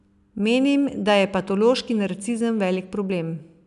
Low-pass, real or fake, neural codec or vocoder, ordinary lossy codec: 10.8 kHz; real; none; none